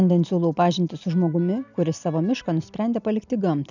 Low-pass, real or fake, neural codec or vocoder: 7.2 kHz; real; none